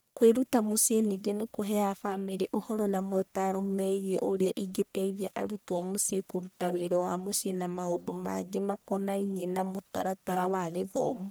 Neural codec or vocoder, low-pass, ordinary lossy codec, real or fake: codec, 44.1 kHz, 1.7 kbps, Pupu-Codec; none; none; fake